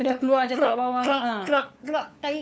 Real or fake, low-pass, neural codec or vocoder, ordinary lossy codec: fake; none; codec, 16 kHz, 4 kbps, FunCodec, trained on LibriTTS, 50 frames a second; none